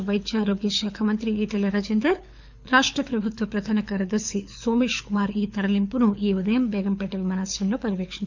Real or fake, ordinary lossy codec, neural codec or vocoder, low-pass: fake; none; codec, 24 kHz, 6 kbps, HILCodec; 7.2 kHz